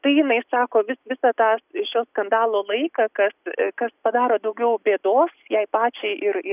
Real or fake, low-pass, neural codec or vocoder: real; 3.6 kHz; none